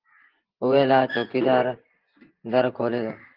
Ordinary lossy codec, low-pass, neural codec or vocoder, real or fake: Opus, 16 kbps; 5.4 kHz; vocoder, 24 kHz, 100 mel bands, Vocos; fake